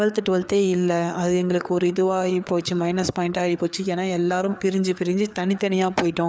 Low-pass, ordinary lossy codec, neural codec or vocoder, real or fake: none; none; codec, 16 kHz, 4 kbps, FreqCodec, larger model; fake